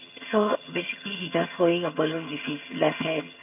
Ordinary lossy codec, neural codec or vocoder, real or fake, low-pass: none; vocoder, 22.05 kHz, 80 mel bands, HiFi-GAN; fake; 3.6 kHz